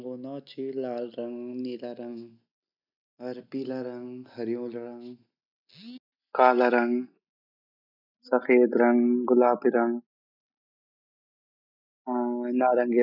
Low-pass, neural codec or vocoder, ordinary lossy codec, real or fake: 5.4 kHz; none; none; real